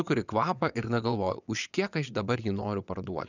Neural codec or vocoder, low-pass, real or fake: vocoder, 22.05 kHz, 80 mel bands, WaveNeXt; 7.2 kHz; fake